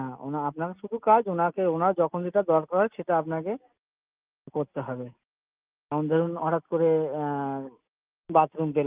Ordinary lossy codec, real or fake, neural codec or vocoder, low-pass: Opus, 32 kbps; real; none; 3.6 kHz